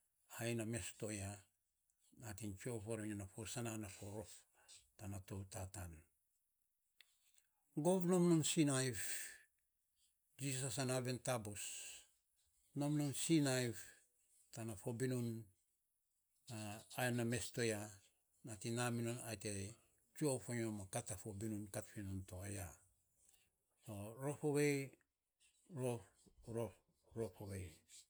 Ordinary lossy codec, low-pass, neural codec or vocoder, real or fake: none; none; none; real